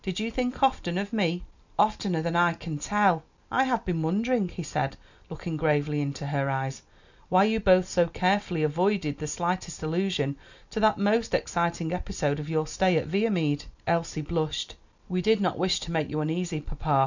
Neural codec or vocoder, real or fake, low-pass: none; real; 7.2 kHz